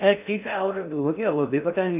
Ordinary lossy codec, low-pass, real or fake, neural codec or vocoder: none; 3.6 kHz; fake; codec, 16 kHz in and 24 kHz out, 0.6 kbps, FocalCodec, streaming, 4096 codes